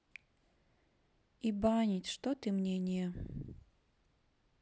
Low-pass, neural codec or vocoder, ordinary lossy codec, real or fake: none; none; none; real